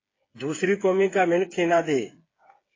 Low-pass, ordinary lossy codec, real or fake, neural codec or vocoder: 7.2 kHz; AAC, 32 kbps; fake; codec, 16 kHz, 8 kbps, FreqCodec, smaller model